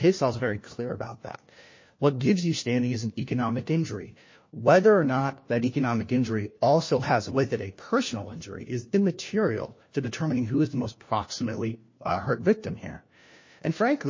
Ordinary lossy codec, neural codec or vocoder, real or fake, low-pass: MP3, 32 kbps; codec, 16 kHz, 1 kbps, FunCodec, trained on LibriTTS, 50 frames a second; fake; 7.2 kHz